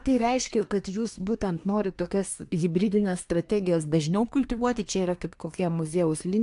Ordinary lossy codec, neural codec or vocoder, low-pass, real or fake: AAC, 64 kbps; codec, 24 kHz, 1 kbps, SNAC; 10.8 kHz; fake